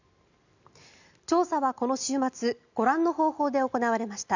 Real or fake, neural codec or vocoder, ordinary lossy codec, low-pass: real; none; none; 7.2 kHz